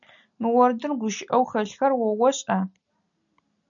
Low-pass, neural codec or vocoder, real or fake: 7.2 kHz; none; real